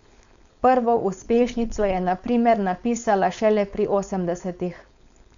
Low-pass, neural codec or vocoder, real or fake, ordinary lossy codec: 7.2 kHz; codec, 16 kHz, 4.8 kbps, FACodec; fake; none